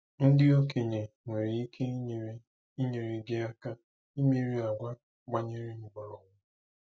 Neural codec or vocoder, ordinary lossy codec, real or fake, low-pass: none; none; real; none